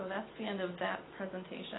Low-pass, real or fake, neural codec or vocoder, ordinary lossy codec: 7.2 kHz; real; none; AAC, 16 kbps